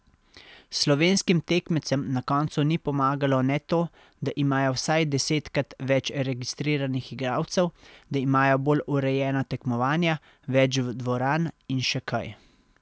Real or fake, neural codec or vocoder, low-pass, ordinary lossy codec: real; none; none; none